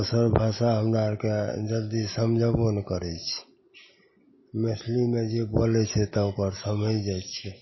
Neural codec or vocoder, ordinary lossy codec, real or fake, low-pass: none; MP3, 24 kbps; real; 7.2 kHz